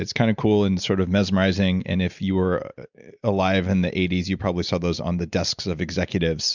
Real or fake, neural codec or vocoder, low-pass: real; none; 7.2 kHz